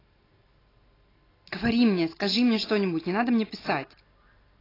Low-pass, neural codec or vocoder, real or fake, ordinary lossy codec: 5.4 kHz; none; real; AAC, 24 kbps